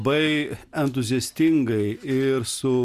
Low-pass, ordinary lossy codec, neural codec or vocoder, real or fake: 14.4 kHz; Opus, 64 kbps; none; real